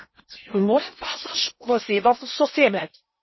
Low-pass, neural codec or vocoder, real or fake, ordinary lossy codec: 7.2 kHz; codec, 16 kHz in and 24 kHz out, 0.8 kbps, FocalCodec, streaming, 65536 codes; fake; MP3, 24 kbps